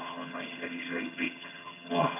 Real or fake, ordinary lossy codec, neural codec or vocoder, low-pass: fake; none; vocoder, 22.05 kHz, 80 mel bands, HiFi-GAN; 3.6 kHz